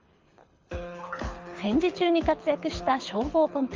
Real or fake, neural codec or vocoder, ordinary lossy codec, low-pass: fake; codec, 24 kHz, 6 kbps, HILCodec; Opus, 32 kbps; 7.2 kHz